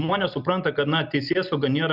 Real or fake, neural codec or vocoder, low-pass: real; none; 5.4 kHz